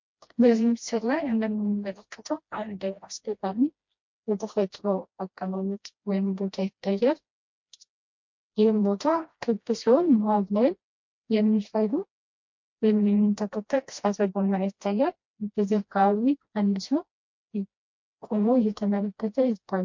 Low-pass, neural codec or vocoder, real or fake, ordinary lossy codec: 7.2 kHz; codec, 16 kHz, 1 kbps, FreqCodec, smaller model; fake; MP3, 48 kbps